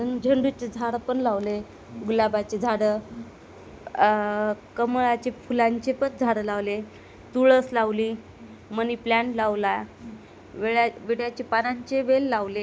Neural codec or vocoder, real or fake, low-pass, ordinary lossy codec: none; real; none; none